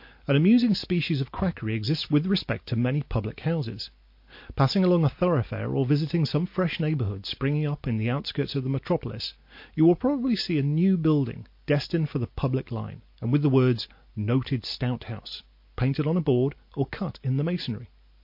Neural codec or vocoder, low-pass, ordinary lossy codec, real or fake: none; 5.4 kHz; MP3, 32 kbps; real